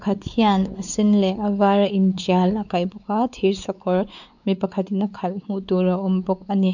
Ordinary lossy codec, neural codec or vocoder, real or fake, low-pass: none; codec, 16 kHz, 4 kbps, X-Codec, WavLM features, trained on Multilingual LibriSpeech; fake; 7.2 kHz